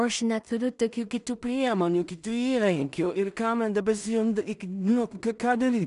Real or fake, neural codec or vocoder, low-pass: fake; codec, 16 kHz in and 24 kHz out, 0.4 kbps, LongCat-Audio-Codec, two codebook decoder; 10.8 kHz